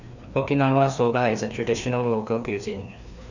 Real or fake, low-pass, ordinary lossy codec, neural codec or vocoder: fake; 7.2 kHz; none; codec, 16 kHz, 2 kbps, FreqCodec, larger model